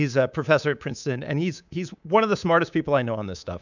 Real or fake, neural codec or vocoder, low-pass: fake; codec, 24 kHz, 3.1 kbps, DualCodec; 7.2 kHz